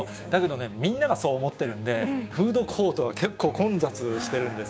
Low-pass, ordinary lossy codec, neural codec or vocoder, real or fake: none; none; codec, 16 kHz, 6 kbps, DAC; fake